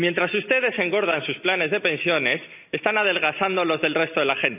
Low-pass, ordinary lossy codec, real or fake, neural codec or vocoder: 3.6 kHz; none; real; none